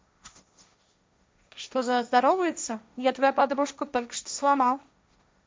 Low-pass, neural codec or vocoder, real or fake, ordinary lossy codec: none; codec, 16 kHz, 1.1 kbps, Voila-Tokenizer; fake; none